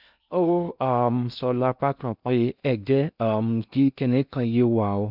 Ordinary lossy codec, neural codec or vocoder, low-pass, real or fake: none; codec, 16 kHz in and 24 kHz out, 0.6 kbps, FocalCodec, streaming, 2048 codes; 5.4 kHz; fake